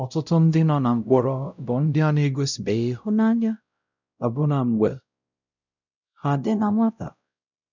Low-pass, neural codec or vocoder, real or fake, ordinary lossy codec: 7.2 kHz; codec, 16 kHz, 0.5 kbps, X-Codec, WavLM features, trained on Multilingual LibriSpeech; fake; none